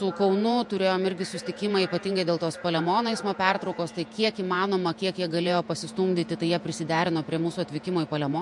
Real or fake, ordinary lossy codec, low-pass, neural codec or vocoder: fake; MP3, 64 kbps; 10.8 kHz; vocoder, 48 kHz, 128 mel bands, Vocos